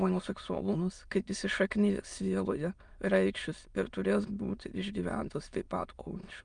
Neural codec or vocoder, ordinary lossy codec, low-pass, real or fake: autoencoder, 22.05 kHz, a latent of 192 numbers a frame, VITS, trained on many speakers; Opus, 32 kbps; 9.9 kHz; fake